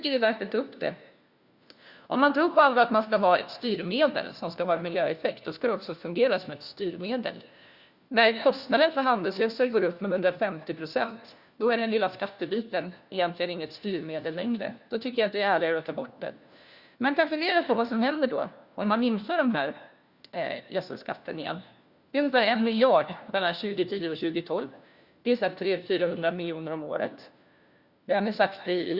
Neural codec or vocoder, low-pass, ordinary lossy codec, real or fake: codec, 16 kHz, 1 kbps, FunCodec, trained on LibriTTS, 50 frames a second; 5.4 kHz; Opus, 64 kbps; fake